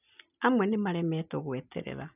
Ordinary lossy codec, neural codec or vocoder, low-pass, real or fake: none; none; 3.6 kHz; real